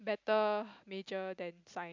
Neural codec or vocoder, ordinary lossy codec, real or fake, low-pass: none; MP3, 64 kbps; real; 7.2 kHz